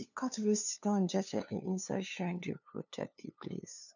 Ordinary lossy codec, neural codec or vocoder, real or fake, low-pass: none; codec, 16 kHz, 2 kbps, FunCodec, trained on LibriTTS, 25 frames a second; fake; 7.2 kHz